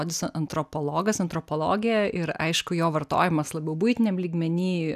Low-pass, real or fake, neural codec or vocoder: 14.4 kHz; real; none